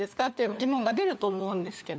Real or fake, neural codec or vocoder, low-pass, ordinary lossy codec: fake; codec, 16 kHz, 4 kbps, FunCodec, trained on LibriTTS, 50 frames a second; none; none